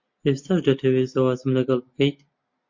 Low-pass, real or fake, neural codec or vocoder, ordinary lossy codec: 7.2 kHz; real; none; MP3, 48 kbps